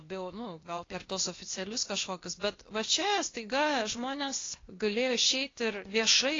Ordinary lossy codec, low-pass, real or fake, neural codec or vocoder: AAC, 32 kbps; 7.2 kHz; fake; codec, 16 kHz, 0.8 kbps, ZipCodec